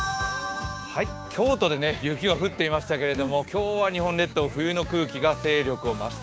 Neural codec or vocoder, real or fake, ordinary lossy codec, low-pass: codec, 16 kHz, 6 kbps, DAC; fake; none; none